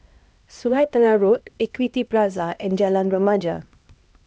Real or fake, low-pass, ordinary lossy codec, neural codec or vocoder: fake; none; none; codec, 16 kHz, 1 kbps, X-Codec, HuBERT features, trained on LibriSpeech